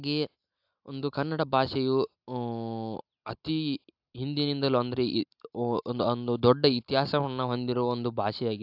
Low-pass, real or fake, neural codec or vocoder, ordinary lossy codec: 5.4 kHz; real; none; AAC, 48 kbps